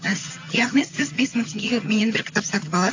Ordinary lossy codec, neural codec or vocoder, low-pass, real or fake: AAC, 48 kbps; vocoder, 22.05 kHz, 80 mel bands, HiFi-GAN; 7.2 kHz; fake